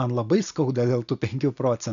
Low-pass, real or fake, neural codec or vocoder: 7.2 kHz; real; none